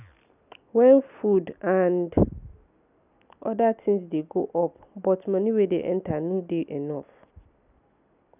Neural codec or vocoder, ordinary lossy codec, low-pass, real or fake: none; none; 3.6 kHz; real